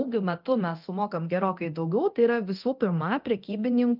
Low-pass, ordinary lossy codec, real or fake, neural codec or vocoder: 5.4 kHz; Opus, 24 kbps; fake; codec, 24 kHz, 0.9 kbps, DualCodec